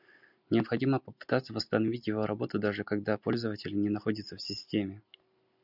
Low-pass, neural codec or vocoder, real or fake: 5.4 kHz; none; real